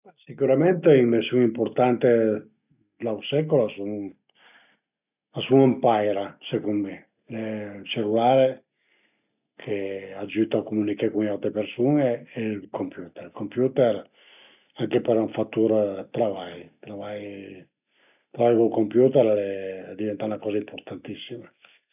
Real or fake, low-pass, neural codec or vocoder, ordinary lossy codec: real; 3.6 kHz; none; none